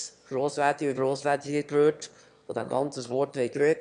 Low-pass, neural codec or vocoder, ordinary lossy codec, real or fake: 9.9 kHz; autoencoder, 22.05 kHz, a latent of 192 numbers a frame, VITS, trained on one speaker; none; fake